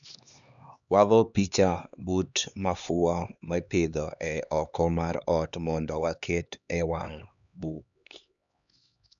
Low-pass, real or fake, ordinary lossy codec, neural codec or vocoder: 7.2 kHz; fake; none; codec, 16 kHz, 2 kbps, X-Codec, HuBERT features, trained on LibriSpeech